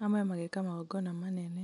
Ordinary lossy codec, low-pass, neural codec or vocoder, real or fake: none; 10.8 kHz; none; real